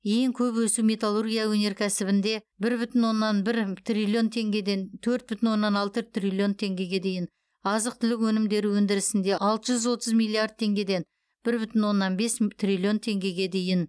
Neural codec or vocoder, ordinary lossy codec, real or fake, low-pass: none; none; real; none